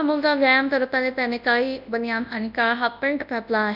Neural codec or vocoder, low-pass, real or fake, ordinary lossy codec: codec, 24 kHz, 0.9 kbps, WavTokenizer, large speech release; 5.4 kHz; fake; none